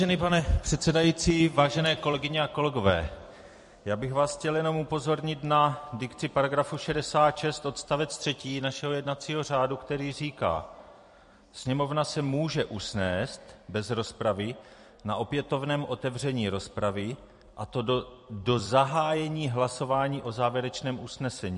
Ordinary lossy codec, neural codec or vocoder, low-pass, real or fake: MP3, 48 kbps; vocoder, 44.1 kHz, 128 mel bands every 256 samples, BigVGAN v2; 14.4 kHz; fake